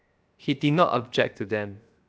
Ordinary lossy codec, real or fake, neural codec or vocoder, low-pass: none; fake; codec, 16 kHz, 0.7 kbps, FocalCodec; none